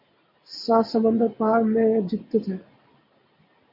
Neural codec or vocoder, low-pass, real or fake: none; 5.4 kHz; real